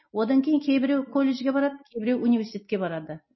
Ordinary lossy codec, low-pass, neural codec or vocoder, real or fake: MP3, 24 kbps; 7.2 kHz; none; real